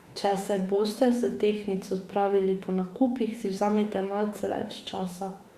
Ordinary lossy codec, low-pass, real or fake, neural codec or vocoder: Opus, 64 kbps; 14.4 kHz; fake; autoencoder, 48 kHz, 32 numbers a frame, DAC-VAE, trained on Japanese speech